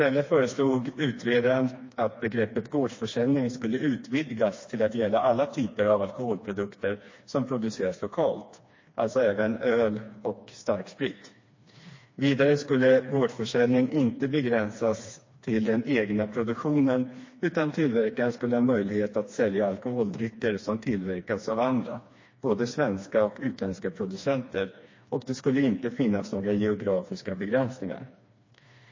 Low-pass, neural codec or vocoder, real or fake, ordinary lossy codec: 7.2 kHz; codec, 16 kHz, 2 kbps, FreqCodec, smaller model; fake; MP3, 32 kbps